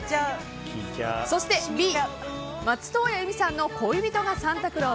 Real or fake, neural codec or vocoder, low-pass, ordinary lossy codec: real; none; none; none